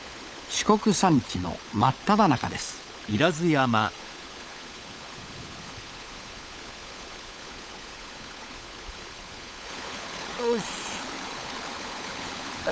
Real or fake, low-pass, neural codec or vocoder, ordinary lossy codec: fake; none; codec, 16 kHz, 16 kbps, FunCodec, trained on LibriTTS, 50 frames a second; none